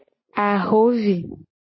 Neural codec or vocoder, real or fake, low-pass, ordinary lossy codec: none; real; 7.2 kHz; MP3, 24 kbps